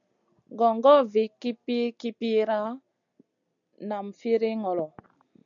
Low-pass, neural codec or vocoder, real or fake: 7.2 kHz; none; real